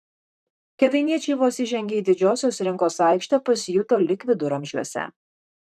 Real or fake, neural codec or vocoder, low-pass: fake; vocoder, 44.1 kHz, 128 mel bands, Pupu-Vocoder; 14.4 kHz